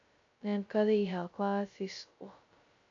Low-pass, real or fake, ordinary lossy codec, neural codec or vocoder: 7.2 kHz; fake; AAC, 48 kbps; codec, 16 kHz, 0.2 kbps, FocalCodec